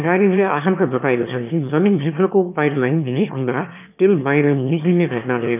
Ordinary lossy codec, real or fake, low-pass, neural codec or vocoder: none; fake; 3.6 kHz; autoencoder, 22.05 kHz, a latent of 192 numbers a frame, VITS, trained on one speaker